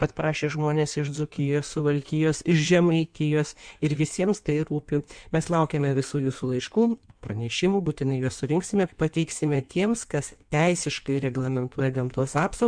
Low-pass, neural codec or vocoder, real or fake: 9.9 kHz; codec, 16 kHz in and 24 kHz out, 1.1 kbps, FireRedTTS-2 codec; fake